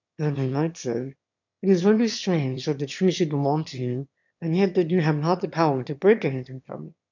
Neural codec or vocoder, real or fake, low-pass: autoencoder, 22.05 kHz, a latent of 192 numbers a frame, VITS, trained on one speaker; fake; 7.2 kHz